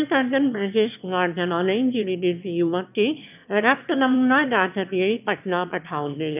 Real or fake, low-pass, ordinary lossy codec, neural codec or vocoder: fake; 3.6 kHz; none; autoencoder, 22.05 kHz, a latent of 192 numbers a frame, VITS, trained on one speaker